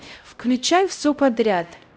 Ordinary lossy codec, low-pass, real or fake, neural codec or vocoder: none; none; fake; codec, 16 kHz, 0.5 kbps, X-Codec, HuBERT features, trained on LibriSpeech